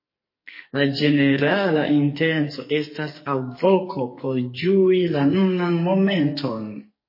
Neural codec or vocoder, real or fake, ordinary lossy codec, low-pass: codec, 44.1 kHz, 2.6 kbps, SNAC; fake; MP3, 24 kbps; 5.4 kHz